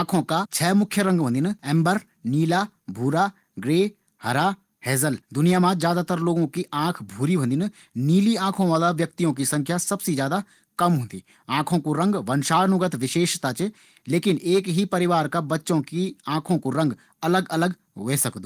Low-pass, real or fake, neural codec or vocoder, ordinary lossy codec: 14.4 kHz; real; none; Opus, 24 kbps